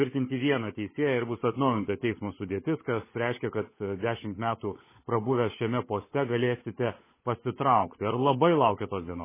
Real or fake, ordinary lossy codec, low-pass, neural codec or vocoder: fake; MP3, 16 kbps; 3.6 kHz; codec, 16 kHz, 16 kbps, FunCodec, trained on LibriTTS, 50 frames a second